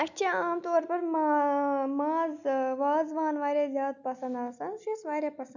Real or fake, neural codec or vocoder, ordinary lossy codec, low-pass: real; none; none; 7.2 kHz